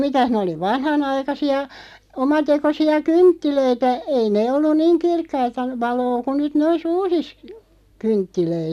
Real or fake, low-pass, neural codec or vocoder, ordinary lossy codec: real; 14.4 kHz; none; none